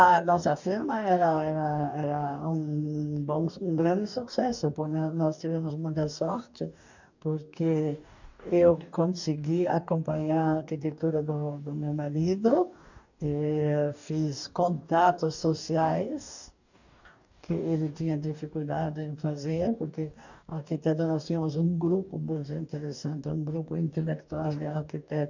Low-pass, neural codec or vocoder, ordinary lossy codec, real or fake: 7.2 kHz; codec, 44.1 kHz, 2.6 kbps, DAC; none; fake